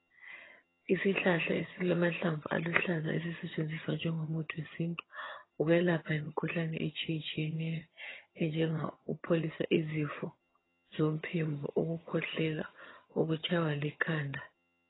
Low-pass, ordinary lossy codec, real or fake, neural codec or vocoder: 7.2 kHz; AAC, 16 kbps; fake; vocoder, 22.05 kHz, 80 mel bands, HiFi-GAN